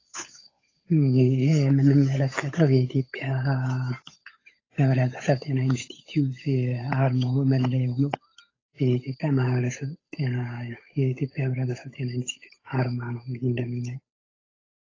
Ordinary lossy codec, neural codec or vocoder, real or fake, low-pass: AAC, 32 kbps; codec, 16 kHz, 8 kbps, FunCodec, trained on Chinese and English, 25 frames a second; fake; 7.2 kHz